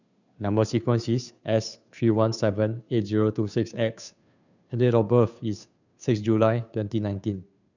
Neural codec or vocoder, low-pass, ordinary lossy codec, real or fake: codec, 16 kHz, 2 kbps, FunCodec, trained on Chinese and English, 25 frames a second; 7.2 kHz; none; fake